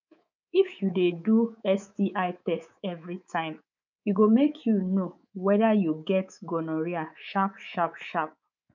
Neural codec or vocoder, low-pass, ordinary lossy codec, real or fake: codec, 24 kHz, 3.1 kbps, DualCodec; 7.2 kHz; none; fake